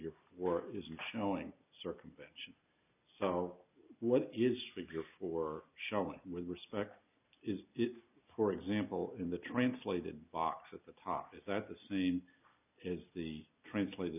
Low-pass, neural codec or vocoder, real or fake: 3.6 kHz; none; real